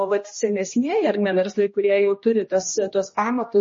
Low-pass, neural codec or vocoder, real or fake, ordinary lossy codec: 7.2 kHz; codec, 16 kHz, 1 kbps, X-Codec, HuBERT features, trained on general audio; fake; MP3, 32 kbps